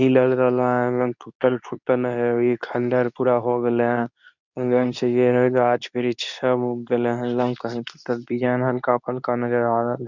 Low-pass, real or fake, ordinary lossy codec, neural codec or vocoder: 7.2 kHz; fake; none; codec, 24 kHz, 0.9 kbps, WavTokenizer, medium speech release version 2